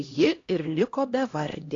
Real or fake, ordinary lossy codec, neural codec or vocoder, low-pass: fake; AAC, 32 kbps; codec, 16 kHz, 1 kbps, X-Codec, HuBERT features, trained on LibriSpeech; 7.2 kHz